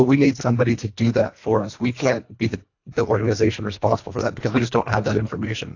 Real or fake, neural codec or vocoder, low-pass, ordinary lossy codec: fake; codec, 24 kHz, 1.5 kbps, HILCodec; 7.2 kHz; AAC, 48 kbps